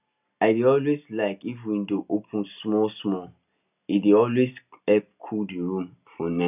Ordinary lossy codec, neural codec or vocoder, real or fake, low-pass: none; none; real; 3.6 kHz